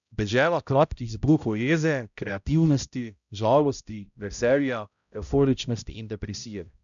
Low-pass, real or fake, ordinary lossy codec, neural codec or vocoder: 7.2 kHz; fake; none; codec, 16 kHz, 0.5 kbps, X-Codec, HuBERT features, trained on balanced general audio